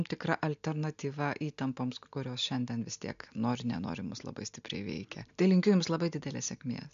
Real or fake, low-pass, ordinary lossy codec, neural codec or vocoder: real; 7.2 kHz; MP3, 64 kbps; none